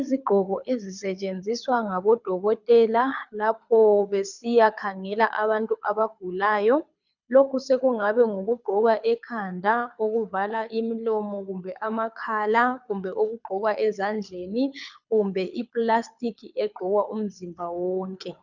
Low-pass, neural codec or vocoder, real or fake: 7.2 kHz; codec, 24 kHz, 6 kbps, HILCodec; fake